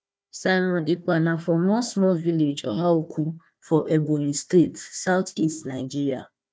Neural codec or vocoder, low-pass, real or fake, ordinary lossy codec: codec, 16 kHz, 1 kbps, FunCodec, trained on Chinese and English, 50 frames a second; none; fake; none